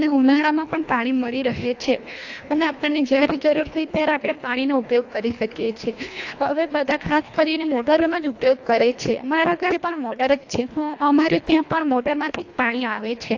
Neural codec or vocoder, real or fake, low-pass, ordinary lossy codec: codec, 24 kHz, 1.5 kbps, HILCodec; fake; 7.2 kHz; AAC, 48 kbps